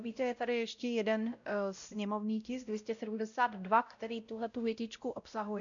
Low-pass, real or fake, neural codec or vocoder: 7.2 kHz; fake; codec, 16 kHz, 0.5 kbps, X-Codec, WavLM features, trained on Multilingual LibriSpeech